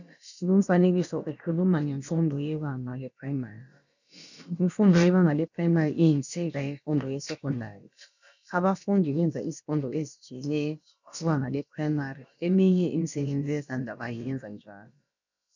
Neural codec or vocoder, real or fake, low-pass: codec, 16 kHz, about 1 kbps, DyCAST, with the encoder's durations; fake; 7.2 kHz